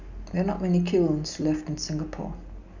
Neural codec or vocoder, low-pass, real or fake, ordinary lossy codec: none; 7.2 kHz; real; none